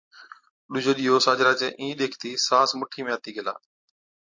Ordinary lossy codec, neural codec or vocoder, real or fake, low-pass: MP3, 48 kbps; none; real; 7.2 kHz